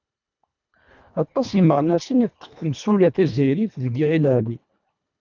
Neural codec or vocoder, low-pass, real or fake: codec, 24 kHz, 1.5 kbps, HILCodec; 7.2 kHz; fake